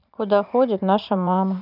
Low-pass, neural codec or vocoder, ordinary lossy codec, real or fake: 5.4 kHz; vocoder, 44.1 kHz, 80 mel bands, Vocos; none; fake